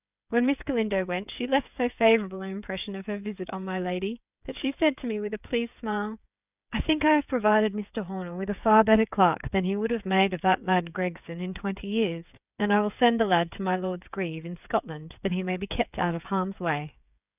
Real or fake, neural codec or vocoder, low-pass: fake; codec, 16 kHz, 8 kbps, FreqCodec, smaller model; 3.6 kHz